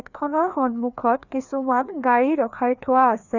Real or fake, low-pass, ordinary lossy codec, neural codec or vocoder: fake; 7.2 kHz; none; codec, 16 kHz, 2 kbps, FreqCodec, larger model